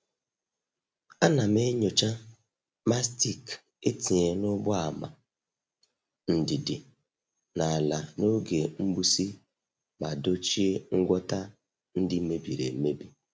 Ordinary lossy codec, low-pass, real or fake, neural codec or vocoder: none; none; real; none